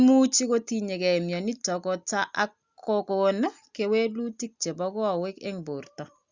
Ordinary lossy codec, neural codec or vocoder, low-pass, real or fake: Opus, 64 kbps; none; 7.2 kHz; real